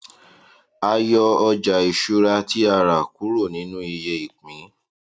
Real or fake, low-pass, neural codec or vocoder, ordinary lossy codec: real; none; none; none